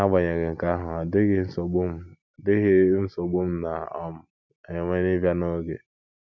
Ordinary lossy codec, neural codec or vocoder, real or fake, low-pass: none; none; real; 7.2 kHz